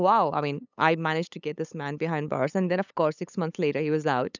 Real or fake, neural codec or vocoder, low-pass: fake; codec, 16 kHz, 8 kbps, FunCodec, trained on LibriTTS, 25 frames a second; 7.2 kHz